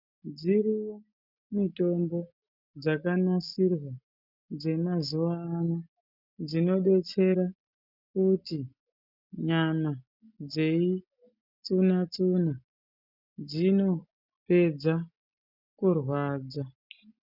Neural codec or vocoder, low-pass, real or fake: none; 5.4 kHz; real